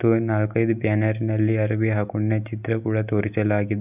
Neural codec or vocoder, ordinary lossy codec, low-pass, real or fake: none; none; 3.6 kHz; real